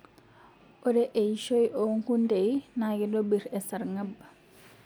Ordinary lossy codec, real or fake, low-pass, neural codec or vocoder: none; real; none; none